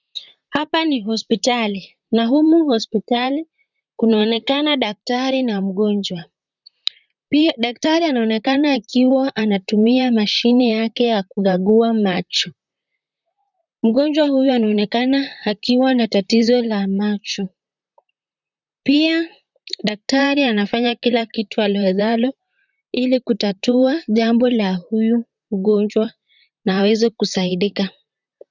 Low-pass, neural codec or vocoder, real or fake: 7.2 kHz; vocoder, 44.1 kHz, 128 mel bands, Pupu-Vocoder; fake